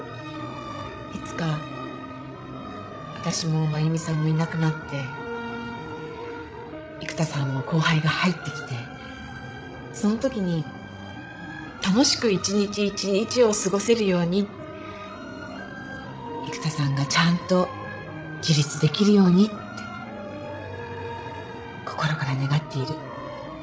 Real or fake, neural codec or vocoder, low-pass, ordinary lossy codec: fake; codec, 16 kHz, 16 kbps, FreqCodec, larger model; none; none